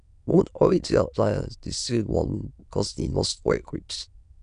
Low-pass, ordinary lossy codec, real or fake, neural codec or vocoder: 9.9 kHz; none; fake; autoencoder, 22.05 kHz, a latent of 192 numbers a frame, VITS, trained on many speakers